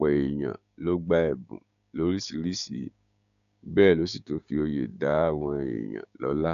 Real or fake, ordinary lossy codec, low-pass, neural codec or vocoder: fake; none; 7.2 kHz; codec, 16 kHz, 6 kbps, DAC